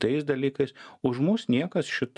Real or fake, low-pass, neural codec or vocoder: real; 10.8 kHz; none